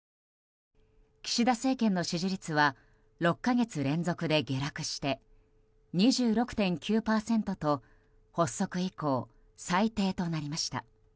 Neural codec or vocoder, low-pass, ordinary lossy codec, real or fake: none; none; none; real